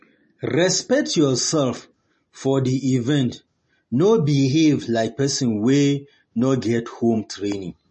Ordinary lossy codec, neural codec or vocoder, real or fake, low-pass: MP3, 32 kbps; none; real; 9.9 kHz